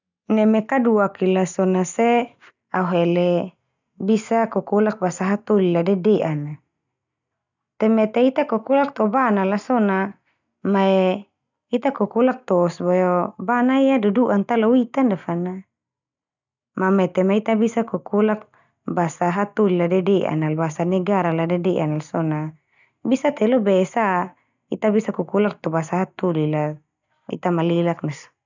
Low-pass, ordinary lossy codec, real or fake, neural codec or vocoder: 7.2 kHz; none; real; none